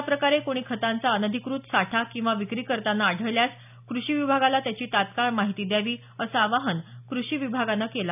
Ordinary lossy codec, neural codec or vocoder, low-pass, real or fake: none; none; 3.6 kHz; real